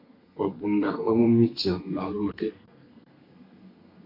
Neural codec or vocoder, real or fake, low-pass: codec, 32 kHz, 1.9 kbps, SNAC; fake; 5.4 kHz